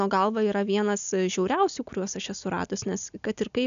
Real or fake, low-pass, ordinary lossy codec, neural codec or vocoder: real; 7.2 kHz; MP3, 96 kbps; none